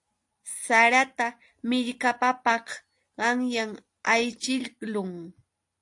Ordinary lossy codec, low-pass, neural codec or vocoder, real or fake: AAC, 64 kbps; 10.8 kHz; none; real